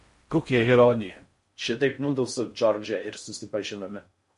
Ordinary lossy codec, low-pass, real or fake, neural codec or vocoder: MP3, 48 kbps; 10.8 kHz; fake; codec, 16 kHz in and 24 kHz out, 0.6 kbps, FocalCodec, streaming, 4096 codes